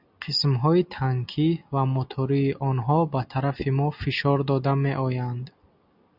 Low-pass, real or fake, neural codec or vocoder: 5.4 kHz; real; none